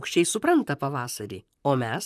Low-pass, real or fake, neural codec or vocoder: 14.4 kHz; fake; vocoder, 44.1 kHz, 128 mel bands, Pupu-Vocoder